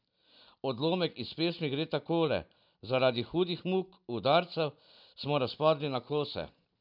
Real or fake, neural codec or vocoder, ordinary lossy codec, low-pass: real; none; none; 5.4 kHz